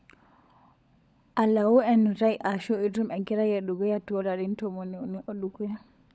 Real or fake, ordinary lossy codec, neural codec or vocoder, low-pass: fake; none; codec, 16 kHz, 16 kbps, FunCodec, trained on LibriTTS, 50 frames a second; none